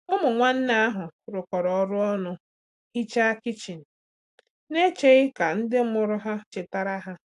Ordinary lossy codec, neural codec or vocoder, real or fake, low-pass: AAC, 64 kbps; none; real; 10.8 kHz